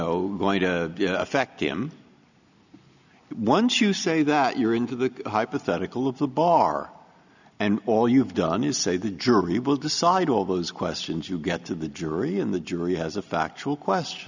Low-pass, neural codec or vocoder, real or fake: 7.2 kHz; none; real